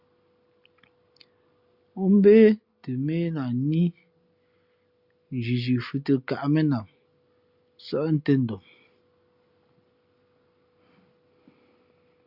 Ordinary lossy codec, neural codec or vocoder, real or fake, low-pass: Opus, 64 kbps; none; real; 5.4 kHz